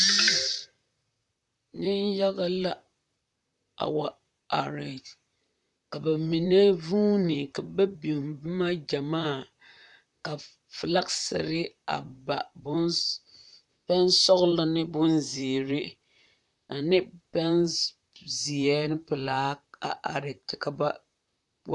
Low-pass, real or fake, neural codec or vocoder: 10.8 kHz; fake; vocoder, 44.1 kHz, 128 mel bands, Pupu-Vocoder